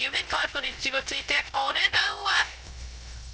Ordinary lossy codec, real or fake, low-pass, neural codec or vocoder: none; fake; none; codec, 16 kHz, 0.3 kbps, FocalCodec